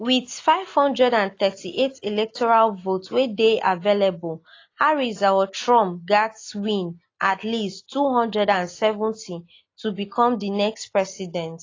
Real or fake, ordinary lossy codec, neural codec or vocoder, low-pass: real; AAC, 32 kbps; none; 7.2 kHz